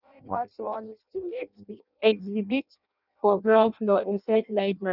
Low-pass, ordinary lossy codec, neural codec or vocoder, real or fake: 5.4 kHz; none; codec, 16 kHz in and 24 kHz out, 0.6 kbps, FireRedTTS-2 codec; fake